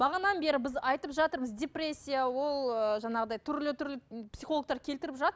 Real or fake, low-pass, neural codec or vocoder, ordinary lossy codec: real; none; none; none